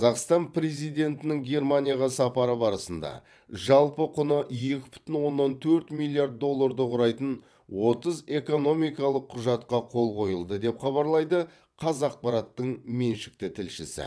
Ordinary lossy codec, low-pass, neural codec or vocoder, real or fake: none; none; vocoder, 22.05 kHz, 80 mel bands, WaveNeXt; fake